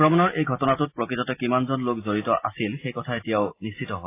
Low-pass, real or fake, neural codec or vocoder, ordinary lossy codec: 3.6 kHz; real; none; AAC, 24 kbps